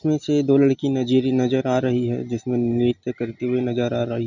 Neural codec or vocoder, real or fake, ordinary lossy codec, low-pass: none; real; none; 7.2 kHz